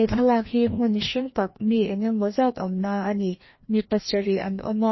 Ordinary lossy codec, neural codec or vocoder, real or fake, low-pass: MP3, 24 kbps; codec, 16 kHz, 1 kbps, FreqCodec, larger model; fake; 7.2 kHz